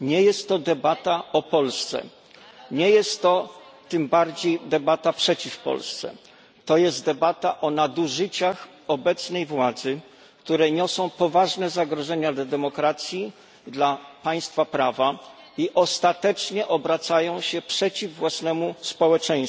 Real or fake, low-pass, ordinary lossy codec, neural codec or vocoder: real; none; none; none